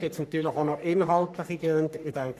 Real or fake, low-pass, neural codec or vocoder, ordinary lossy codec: fake; 14.4 kHz; codec, 44.1 kHz, 3.4 kbps, Pupu-Codec; none